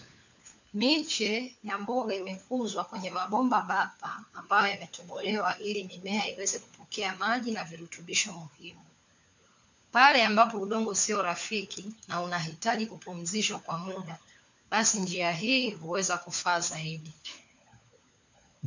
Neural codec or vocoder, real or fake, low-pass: codec, 16 kHz, 4 kbps, FunCodec, trained on LibriTTS, 50 frames a second; fake; 7.2 kHz